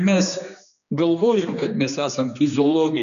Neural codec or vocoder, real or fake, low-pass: codec, 16 kHz, 2 kbps, X-Codec, HuBERT features, trained on general audio; fake; 7.2 kHz